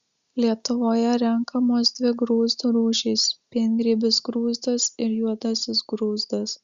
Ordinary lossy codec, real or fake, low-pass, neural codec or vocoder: Opus, 64 kbps; real; 7.2 kHz; none